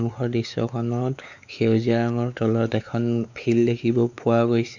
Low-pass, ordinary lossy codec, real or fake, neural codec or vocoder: 7.2 kHz; none; fake; codec, 16 kHz, 4 kbps, X-Codec, WavLM features, trained on Multilingual LibriSpeech